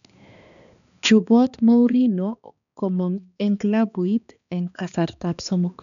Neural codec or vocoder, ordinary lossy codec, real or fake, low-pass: codec, 16 kHz, 2 kbps, X-Codec, HuBERT features, trained on balanced general audio; none; fake; 7.2 kHz